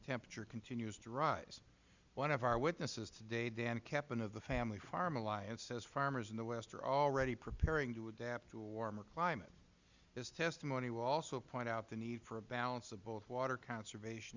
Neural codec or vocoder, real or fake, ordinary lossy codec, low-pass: none; real; Opus, 64 kbps; 7.2 kHz